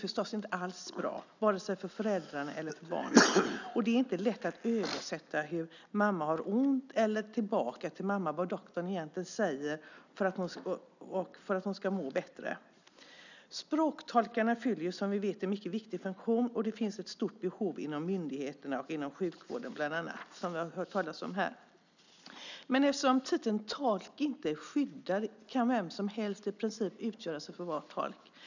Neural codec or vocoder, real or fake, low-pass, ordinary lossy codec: none; real; 7.2 kHz; none